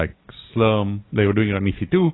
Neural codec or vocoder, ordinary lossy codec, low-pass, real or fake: none; AAC, 16 kbps; 7.2 kHz; real